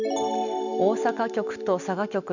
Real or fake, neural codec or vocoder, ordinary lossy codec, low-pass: real; none; none; 7.2 kHz